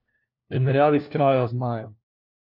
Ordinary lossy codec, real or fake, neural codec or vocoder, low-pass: none; fake; codec, 16 kHz, 1 kbps, FunCodec, trained on LibriTTS, 50 frames a second; 5.4 kHz